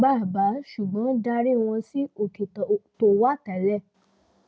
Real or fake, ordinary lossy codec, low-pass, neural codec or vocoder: real; none; none; none